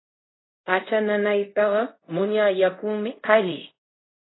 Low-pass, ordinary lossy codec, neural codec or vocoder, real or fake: 7.2 kHz; AAC, 16 kbps; codec, 24 kHz, 0.5 kbps, DualCodec; fake